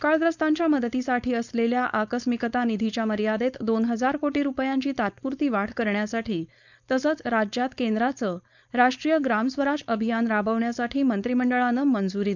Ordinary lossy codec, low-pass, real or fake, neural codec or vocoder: none; 7.2 kHz; fake; codec, 16 kHz, 4.8 kbps, FACodec